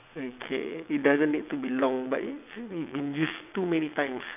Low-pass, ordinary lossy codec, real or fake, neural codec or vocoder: 3.6 kHz; none; fake; vocoder, 22.05 kHz, 80 mel bands, WaveNeXt